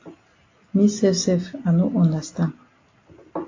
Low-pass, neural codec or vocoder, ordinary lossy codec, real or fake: 7.2 kHz; none; AAC, 32 kbps; real